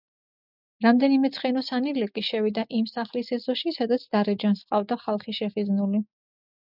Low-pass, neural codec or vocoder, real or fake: 5.4 kHz; none; real